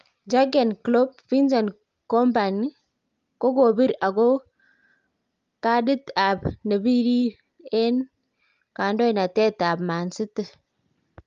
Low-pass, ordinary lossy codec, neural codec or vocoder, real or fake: 7.2 kHz; Opus, 32 kbps; none; real